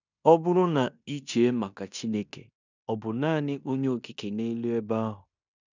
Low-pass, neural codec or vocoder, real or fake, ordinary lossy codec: 7.2 kHz; codec, 16 kHz in and 24 kHz out, 0.9 kbps, LongCat-Audio-Codec, fine tuned four codebook decoder; fake; none